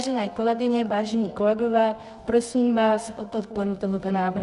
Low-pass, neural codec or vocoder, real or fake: 10.8 kHz; codec, 24 kHz, 0.9 kbps, WavTokenizer, medium music audio release; fake